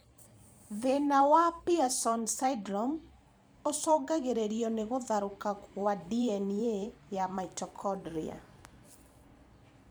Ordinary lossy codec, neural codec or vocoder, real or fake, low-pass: none; vocoder, 44.1 kHz, 128 mel bands every 512 samples, BigVGAN v2; fake; none